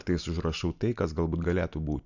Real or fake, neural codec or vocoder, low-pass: real; none; 7.2 kHz